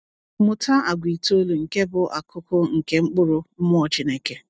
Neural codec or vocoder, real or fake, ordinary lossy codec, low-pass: none; real; none; none